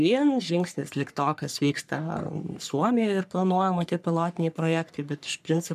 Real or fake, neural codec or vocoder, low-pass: fake; codec, 44.1 kHz, 3.4 kbps, Pupu-Codec; 14.4 kHz